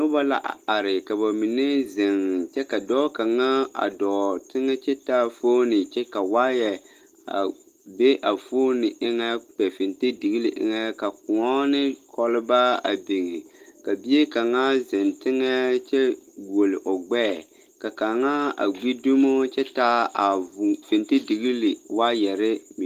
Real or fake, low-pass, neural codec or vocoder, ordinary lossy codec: real; 14.4 kHz; none; Opus, 24 kbps